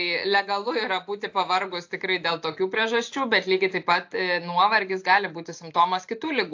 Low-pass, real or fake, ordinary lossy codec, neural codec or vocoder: 7.2 kHz; real; AAC, 48 kbps; none